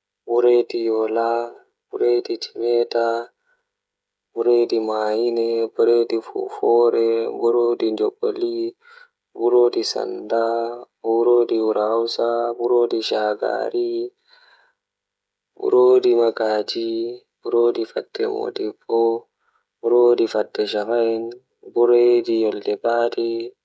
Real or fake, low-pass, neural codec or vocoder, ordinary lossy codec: fake; none; codec, 16 kHz, 16 kbps, FreqCodec, smaller model; none